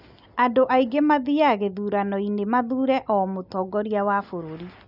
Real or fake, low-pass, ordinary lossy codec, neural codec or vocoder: real; 5.4 kHz; none; none